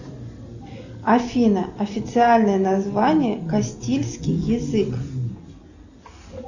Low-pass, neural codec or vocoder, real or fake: 7.2 kHz; none; real